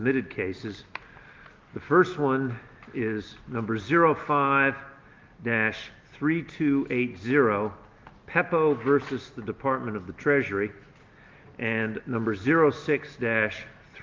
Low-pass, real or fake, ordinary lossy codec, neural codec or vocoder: 7.2 kHz; real; Opus, 32 kbps; none